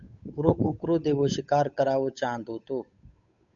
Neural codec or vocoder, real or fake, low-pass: codec, 16 kHz, 8 kbps, FunCodec, trained on Chinese and English, 25 frames a second; fake; 7.2 kHz